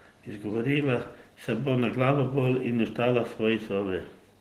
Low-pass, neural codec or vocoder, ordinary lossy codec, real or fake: 14.4 kHz; none; Opus, 16 kbps; real